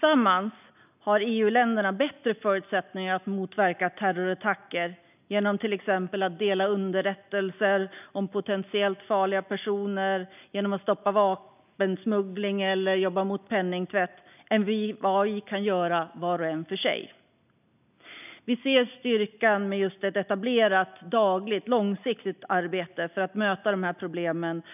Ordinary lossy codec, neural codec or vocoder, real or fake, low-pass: none; none; real; 3.6 kHz